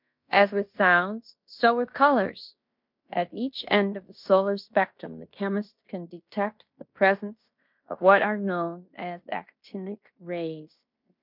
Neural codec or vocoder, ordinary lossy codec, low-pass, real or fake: codec, 16 kHz in and 24 kHz out, 0.9 kbps, LongCat-Audio-Codec, fine tuned four codebook decoder; MP3, 48 kbps; 5.4 kHz; fake